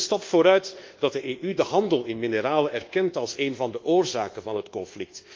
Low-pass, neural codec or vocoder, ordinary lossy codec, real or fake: 7.2 kHz; codec, 24 kHz, 1.2 kbps, DualCodec; Opus, 32 kbps; fake